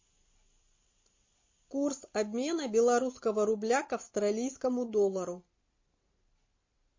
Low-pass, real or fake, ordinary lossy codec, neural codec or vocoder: 7.2 kHz; real; MP3, 32 kbps; none